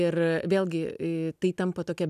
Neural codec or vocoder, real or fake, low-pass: none; real; 14.4 kHz